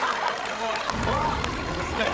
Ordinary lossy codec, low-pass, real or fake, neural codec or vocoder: none; none; fake; codec, 16 kHz, 16 kbps, FreqCodec, larger model